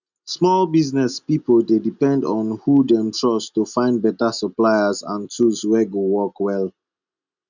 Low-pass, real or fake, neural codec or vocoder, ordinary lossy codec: 7.2 kHz; real; none; none